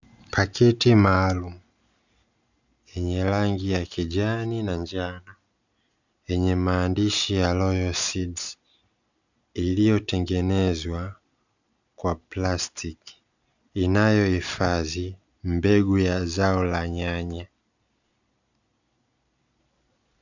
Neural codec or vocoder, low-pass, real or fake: none; 7.2 kHz; real